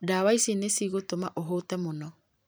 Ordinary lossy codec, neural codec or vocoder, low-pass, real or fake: none; vocoder, 44.1 kHz, 128 mel bands every 256 samples, BigVGAN v2; none; fake